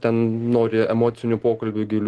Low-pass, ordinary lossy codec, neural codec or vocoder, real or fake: 10.8 kHz; Opus, 16 kbps; none; real